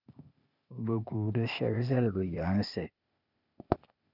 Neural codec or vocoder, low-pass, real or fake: codec, 16 kHz, 0.8 kbps, ZipCodec; 5.4 kHz; fake